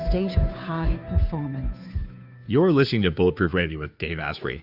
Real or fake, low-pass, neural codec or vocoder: fake; 5.4 kHz; codec, 16 kHz, 2 kbps, FunCodec, trained on Chinese and English, 25 frames a second